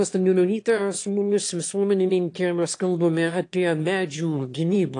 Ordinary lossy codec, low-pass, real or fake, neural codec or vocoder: AAC, 48 kbps; 9.9 kHz; fake; autoencoder, 22.05 kHz, a latent of 192 numbers a frame, VITS, trained on one speaker